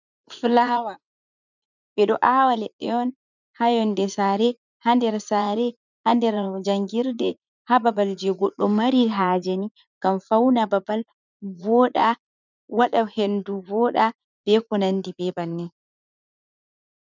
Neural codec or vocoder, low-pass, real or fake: vocoder, 44.1 kHz, 80 mel bands, Vocos; 7.2 kHz; fake